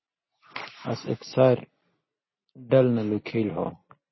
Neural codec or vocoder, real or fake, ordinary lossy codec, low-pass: none; real; MP3, 24 kbps; 7.2 kHz